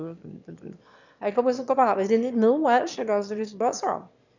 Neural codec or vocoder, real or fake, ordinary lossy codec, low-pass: autoencoder, 22.05 kHz, a latent of 192 numbers a frame, VITS, trained on one speaker; fake; none; 7.2 kHz